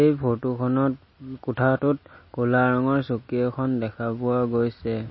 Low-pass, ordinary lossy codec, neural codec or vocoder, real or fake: 7.2 kHz; MP3, 24 kbps; none; real